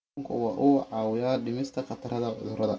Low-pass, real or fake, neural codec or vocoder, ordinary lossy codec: none; real; none; none